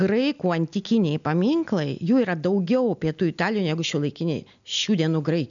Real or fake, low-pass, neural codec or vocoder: real; 7.2 kHz; none